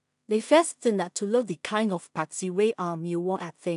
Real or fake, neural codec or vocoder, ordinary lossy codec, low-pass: fake; codec, 16 kHz in and 24 kHz out, 0.4 kbps, LongCat-Audio-Codec, two codebook decoder; none; 10.8 kHz